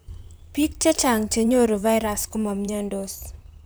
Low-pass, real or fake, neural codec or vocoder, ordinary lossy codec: none; fake; vocoder, 44.1 kHz, 128 mel bands, Pupu-Vocoder; none